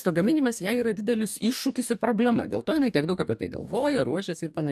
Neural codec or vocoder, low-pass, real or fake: codec, 44.1 kHz, 2.6 kbps, DAC; 14.4 kHz; fake